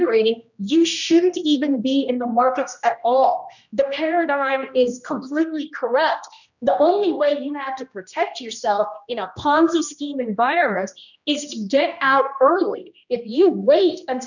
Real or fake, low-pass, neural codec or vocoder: fake; 7.2 kHz; codec, 16 kHz, 1 kbps, X-Codec, HuBERT features, trained on general audio